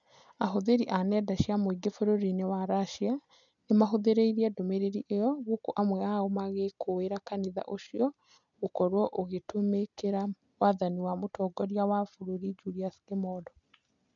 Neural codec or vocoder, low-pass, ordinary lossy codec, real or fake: none; 7.2 kHz; none; real